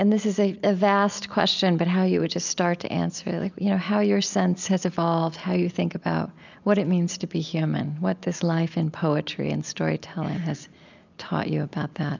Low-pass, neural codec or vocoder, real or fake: 7.2 kHz; none; real